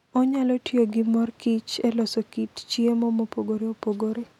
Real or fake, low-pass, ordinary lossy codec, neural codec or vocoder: real; 19.8 kHz; none; none